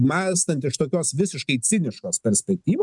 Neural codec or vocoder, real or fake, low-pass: none; real; 10.8 kHz